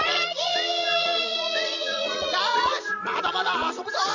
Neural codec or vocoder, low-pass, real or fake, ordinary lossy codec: vocoder, 22.05 kHz, 80 mel bands, Vocos; 7.2 kHz; fake; Opus, 64 kbps